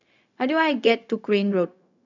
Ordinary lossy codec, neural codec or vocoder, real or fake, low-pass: none; codec, 16 kHz, 0.4 kbps, LongCat-Audio-Codec; fake; 7.2 kHz